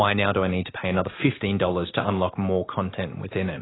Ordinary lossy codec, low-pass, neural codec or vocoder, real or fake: AAC, 16 kbps; 7.2 kHz; none; real